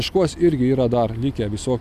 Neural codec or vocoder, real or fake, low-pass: none; real; 14.4 kHz